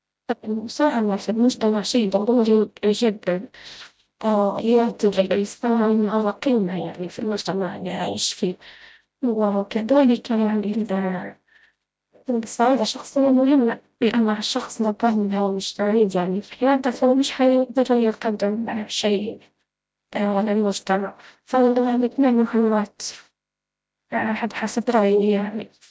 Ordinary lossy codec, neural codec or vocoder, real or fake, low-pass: none; codec, 16 kHz, 0.5 kbps, FreqCodec, smaller model; fake; none